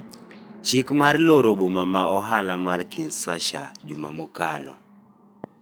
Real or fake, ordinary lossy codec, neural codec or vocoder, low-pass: fake; none; codec, 44.1 kHz, 2.6 kbps, SNAC; none